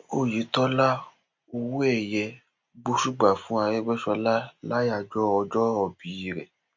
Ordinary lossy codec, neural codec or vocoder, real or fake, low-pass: MP3, 48 kbps; none; real; 7.2 kHz